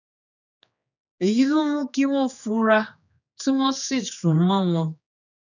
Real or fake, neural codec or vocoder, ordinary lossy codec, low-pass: fake; codec, 16 kHz, 2 kbps, X-Codec, HuBERT features, trained on general audio; none; 7.2 kHz